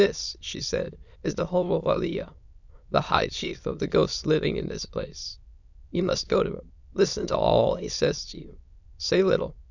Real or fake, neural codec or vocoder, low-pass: fake; autoencoder, 22.05 kHz, a latent of 192 numbers a frame, VITS, trained on many speakers; 7.2 kHz